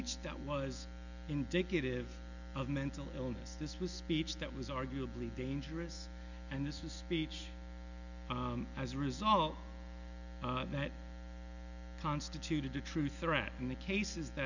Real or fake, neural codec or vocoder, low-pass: real; none; 7.2 kHz